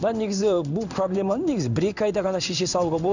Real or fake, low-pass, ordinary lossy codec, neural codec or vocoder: fake; 7.2 kHz; none; codec, 16 kHz in and 24 kHz out, 1 kbps, XY-Tokenizer